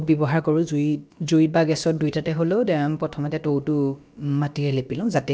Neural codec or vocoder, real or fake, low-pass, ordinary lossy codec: codec, 16 kHz, about 1 kbps, DyCAST, with the encoder's durations; fake; none; none